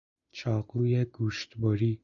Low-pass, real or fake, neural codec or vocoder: 7.2 kHz; real; none